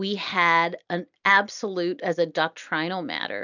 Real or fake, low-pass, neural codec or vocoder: real; 7.2 kHz; none